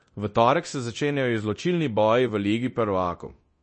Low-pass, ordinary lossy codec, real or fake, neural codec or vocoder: 9.9 kHz; MP3, 32 kbps; fake; codec, 24 kHz, 0.9 kbps, DualCodec